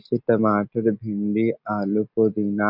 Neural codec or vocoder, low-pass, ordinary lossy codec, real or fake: none; 5.4 kHz; none; real